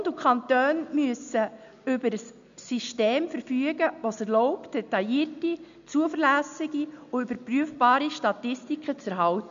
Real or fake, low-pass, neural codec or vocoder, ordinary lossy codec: real; 7.2 kHz; none; none